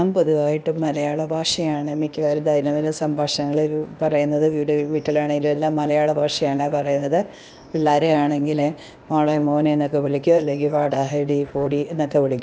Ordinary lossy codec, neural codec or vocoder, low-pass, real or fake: none; codec, 16 kHz, 0.8 kbps, ZipCodec; none; fake